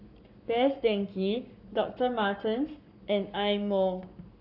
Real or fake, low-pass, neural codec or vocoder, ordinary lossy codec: fake; 5.4 kHz; codec, 44.1 kHz, 7.8 kbps, Pupu-Codec; none